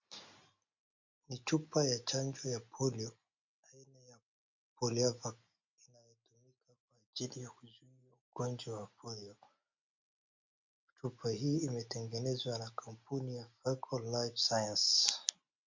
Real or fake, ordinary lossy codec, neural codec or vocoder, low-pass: real; MP3, 48 kbps; none; 7.2 kHz